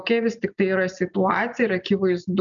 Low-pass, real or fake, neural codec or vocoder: 7.2 kHz; real; none